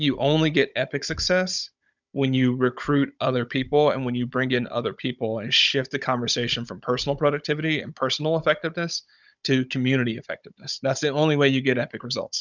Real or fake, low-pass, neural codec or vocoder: fake; 7.2 kHz; codec, 16 kHz, 16 kbps, FunCodec, trained on Chinese and English, 50 frames a second